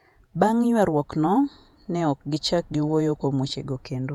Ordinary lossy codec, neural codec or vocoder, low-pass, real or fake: none; vocoder, 48 kHz, 128 mel bands, Vocos; 19.8 kHz; fake